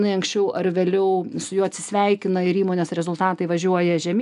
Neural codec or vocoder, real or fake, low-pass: none; real; 10.8 kHz